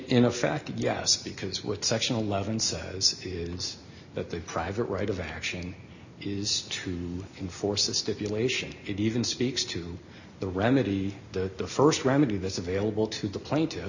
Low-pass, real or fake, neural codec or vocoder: 7.2 kHz; real; none